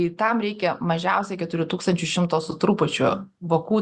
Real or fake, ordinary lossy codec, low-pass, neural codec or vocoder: real; Opus, 64 kbps; 10.8 kHz; none